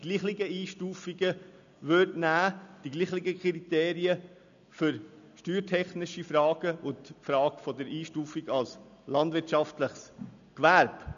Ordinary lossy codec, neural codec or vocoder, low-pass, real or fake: none; none; 7.2 kHz; real